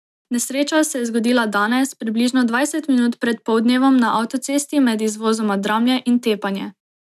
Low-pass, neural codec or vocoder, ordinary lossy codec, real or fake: 14.4 kHz; none; none; real